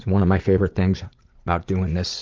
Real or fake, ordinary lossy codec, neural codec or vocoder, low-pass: fake; Opus, 32 kbps; vocoder, 44.1 kHz, 80 mel bands, Vocos; 7.2 kHz